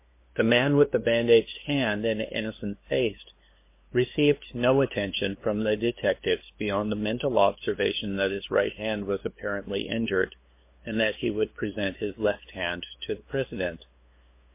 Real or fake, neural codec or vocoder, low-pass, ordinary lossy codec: fake; codec, 16 kHz, 2 kbps, FunCodec, trained on LibriTTS, 25 frames a second; 3.6 kHz; MP3, 24 kbps